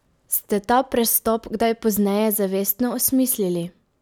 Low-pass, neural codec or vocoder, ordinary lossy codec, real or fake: none; none; none; real